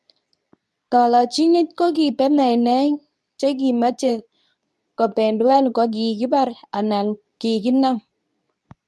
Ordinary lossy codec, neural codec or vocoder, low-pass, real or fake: none; codec, 24 kHz, 0.9 kbps, WavTokenizer, medium speech release version 2; none; fake